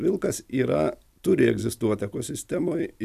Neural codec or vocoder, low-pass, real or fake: none; 14.4 kHz; real